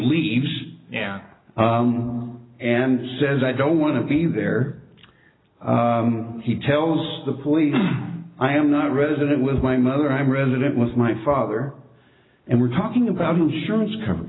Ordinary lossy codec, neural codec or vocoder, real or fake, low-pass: AAC, 16 kbps; none; real; 7.2 kHz